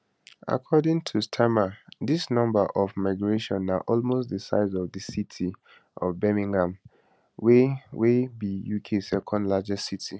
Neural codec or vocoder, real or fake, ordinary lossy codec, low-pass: none; real; none; none